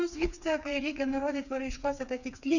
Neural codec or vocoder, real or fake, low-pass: codec, 16 kHz, 4 kbps, FreqCodec, smaller model; fake; 7.2 kHz